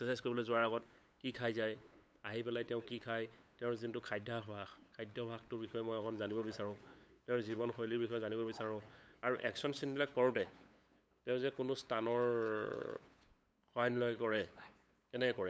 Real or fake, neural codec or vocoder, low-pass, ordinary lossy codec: fake; codec, 16 kHz, 8 kbps, FunCodec, trained on LibriTTS, 25 frames a second; none; none